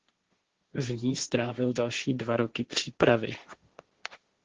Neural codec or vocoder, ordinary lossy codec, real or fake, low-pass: codec, 16 kHz, 1.1 kbps, Voila-Tokenizer; Opus, 16 kbps; fake; 7.2 kHz